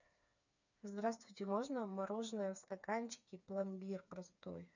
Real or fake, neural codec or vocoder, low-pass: fake; codec, 16 kHz, 4 kbps, FreqCodec, smaller model; 7.2 kHz